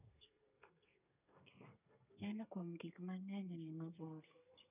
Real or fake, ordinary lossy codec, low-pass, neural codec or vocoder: fake; none; 3.6 kHz; codec, 32 kHz, 1.9 kbps, SNAC